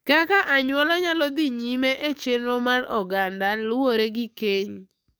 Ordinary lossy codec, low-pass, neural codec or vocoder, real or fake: none; none; codec, 44.1 kHz, 7.8 kbps, DAC; fake